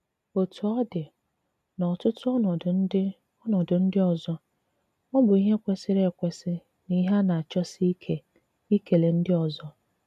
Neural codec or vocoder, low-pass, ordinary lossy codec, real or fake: none; 14.4 kHz; none; real